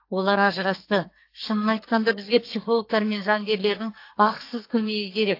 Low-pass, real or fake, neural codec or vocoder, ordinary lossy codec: 5.4 kHz; fake; codec, 44.1 kHz, 2.6 kbps, SNAC; AAC, 32 kbps